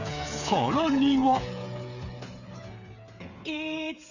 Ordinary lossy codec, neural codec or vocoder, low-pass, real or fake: none; codec, 16 kHz, 8 kbps, FreqCodec, smaller model; 7.2 kHz; fake